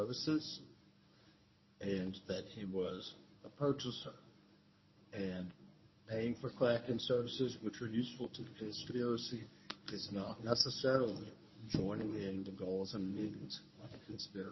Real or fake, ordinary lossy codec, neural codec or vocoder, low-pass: fake; MP3, 24 kbps; codec, 24 kHz, 0.9 kbps, WavTokenizer, medium speech release version 1; 7.2 kHz